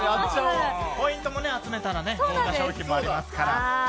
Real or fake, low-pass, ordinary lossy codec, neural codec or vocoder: real; none; none; none